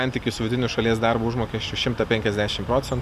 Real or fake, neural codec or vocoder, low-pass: real; none; 14.4 kHz